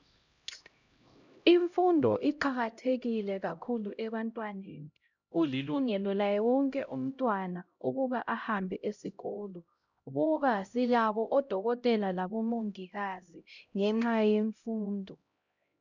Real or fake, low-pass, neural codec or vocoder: fake; 7.2 kHz; codec, 16 kHz, 0.5 kbps, X-Codec, HuBERT features, trained on LibriSpeech